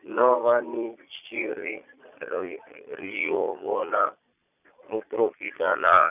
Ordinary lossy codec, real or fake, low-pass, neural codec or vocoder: none; fake; 3.6 kHz; vocoder, 22.05 kHz, 80 mel bands, Vocos